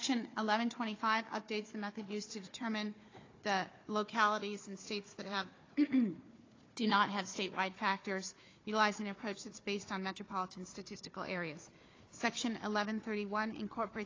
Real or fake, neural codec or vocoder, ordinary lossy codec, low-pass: fake; codec, 16 kHz, 4 kbps, FunCodec, trained on Chinese and English, 50 frames a second; AAC, 32 kbps; 7.2 kHz